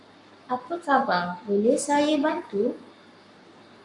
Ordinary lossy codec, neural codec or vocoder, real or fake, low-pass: MP3, 64 kbps; codec, 44.1 kHz, 7.8 kbps, Pupu-Codec; fake; 10.8 kHz